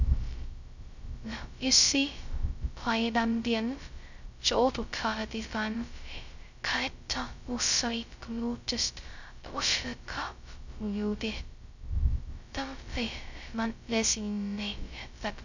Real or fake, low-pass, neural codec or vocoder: fake; 7.2 kHz; codec, 16 kHz, 0.2 kbps, FocalCodec